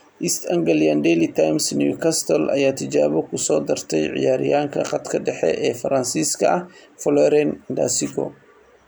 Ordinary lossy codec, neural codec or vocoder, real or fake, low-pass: none; none; real; none